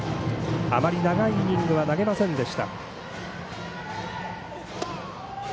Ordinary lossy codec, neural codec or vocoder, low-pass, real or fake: none; none; none; real